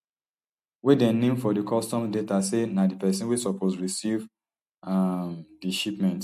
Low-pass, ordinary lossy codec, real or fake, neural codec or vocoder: 14.4 kHz; MP3, 64 kbps; real; none